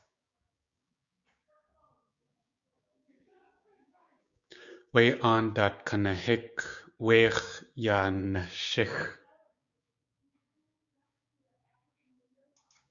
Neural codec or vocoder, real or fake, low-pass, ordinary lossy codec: codec, 16 kHz, 6 kbps, DAC; fake; 7.2 kHz; Opus, 64 kbps